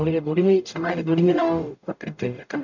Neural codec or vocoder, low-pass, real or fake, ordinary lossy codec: codec, 44.1 kHz, 0.9 kbps, DAC; 7.2 kHz; fake; none